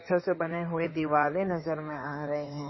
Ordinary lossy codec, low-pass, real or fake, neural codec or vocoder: MP3, 24 kbps; 7.2 kHz; fake; codec, 16 kHz in and 24 kHz out, 2.2 kbps, FireRedTTS-2 codec